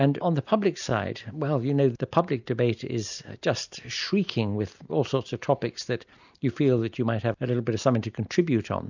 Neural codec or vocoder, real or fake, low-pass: none; real; 7.2 kHz